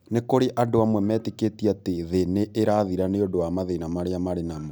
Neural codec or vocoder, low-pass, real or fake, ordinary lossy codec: none; none; real; none